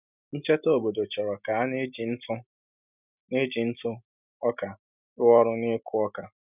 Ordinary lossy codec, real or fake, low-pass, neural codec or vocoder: none; real; 3.6 kHz; none